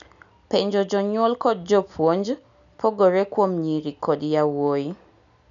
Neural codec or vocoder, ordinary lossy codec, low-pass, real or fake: none; none; 7.2 kHz; real